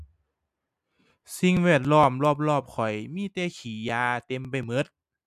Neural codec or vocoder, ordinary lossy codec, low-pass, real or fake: none; MP3, 96 kbps; 14.4 kHz; real